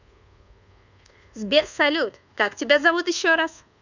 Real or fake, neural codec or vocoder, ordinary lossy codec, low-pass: fake; codec, 24 kHz, 1.2 kbps, DualCodec; none; 7.2 kHz